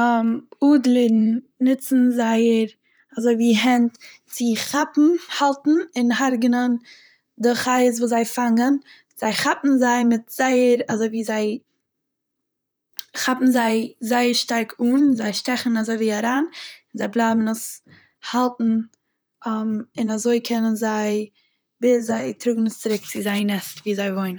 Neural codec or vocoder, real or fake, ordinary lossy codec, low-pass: vocoder, 44.1 kHz, 128 mel bands, Pupu-Vocoder; fake; none; none